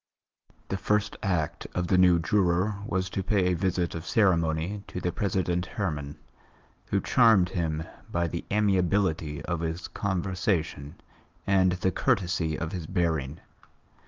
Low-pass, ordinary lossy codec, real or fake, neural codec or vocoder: 7.2 kHz; Opus, 32 kbps; real; none